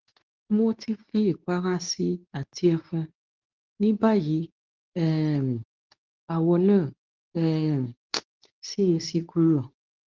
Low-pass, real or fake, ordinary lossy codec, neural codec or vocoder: 7.2 kHz; fake; Opus, 24 kbps; codec, 24 kHz, 0.9 kbps, WavTokenizer, medium speech release version 2